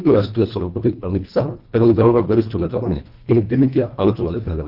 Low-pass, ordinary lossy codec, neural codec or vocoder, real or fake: 5.4 kHz; Opus, 32 kbps; codec, 24 kHz, 1.5 kbps, HILCodec; fake